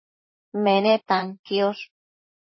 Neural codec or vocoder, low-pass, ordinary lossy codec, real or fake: vocoder, 44.1 kHz, 128 mel bands, Pupu-Vocoder; 7.2 kHz; MP3, 24 kbps; fake